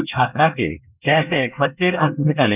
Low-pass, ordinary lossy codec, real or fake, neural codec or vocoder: 3.6 kHz; none; fake; codec, 24 kHz, 1 kbps, SNAC